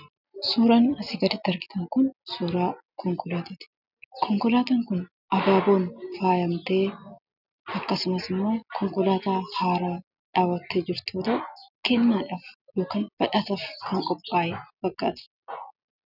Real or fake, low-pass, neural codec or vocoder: real; 5.4 kHz; none